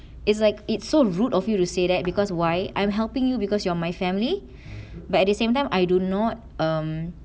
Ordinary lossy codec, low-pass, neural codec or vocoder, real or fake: none; none; none; real